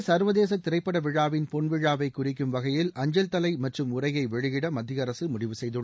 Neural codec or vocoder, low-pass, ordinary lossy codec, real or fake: none; none; none; real